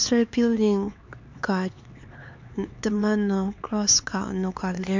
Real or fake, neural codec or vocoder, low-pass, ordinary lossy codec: fake; codec, 16 kHz, 4 kbps, X-Codec, HuBERT features, trained on LibriSpeech; 7.2 kHz; MP3, 64 kbps